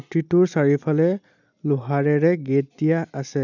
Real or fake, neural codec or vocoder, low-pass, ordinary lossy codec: real; none; 7.2 kHz; none